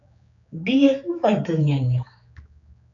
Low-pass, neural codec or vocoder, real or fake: 7.2 kHz; codec, 16 kHz, 4 kbps, X-Codec, HuBERT features, trained on general audio; fake